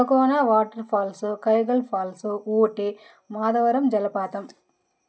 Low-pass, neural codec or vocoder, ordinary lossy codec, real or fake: none; none; none; real